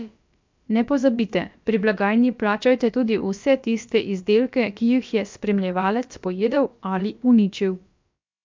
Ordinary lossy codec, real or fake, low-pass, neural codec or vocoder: MP3, 64 kbps; fake; 7.2 kHz; codec, 16 kHz, about 1 kbps, DyCAST, with the encoder's durations